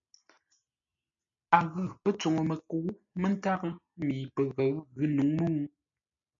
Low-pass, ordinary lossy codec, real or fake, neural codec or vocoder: 7.2 kHz; MP3, 64 kbps; real; none